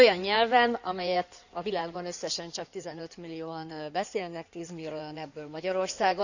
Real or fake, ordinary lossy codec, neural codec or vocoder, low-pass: fake; none; codec, 16 kHz in and 24 kHz out, 2.2 kbps, FireRedTTS-2 codec; 7.2 kHz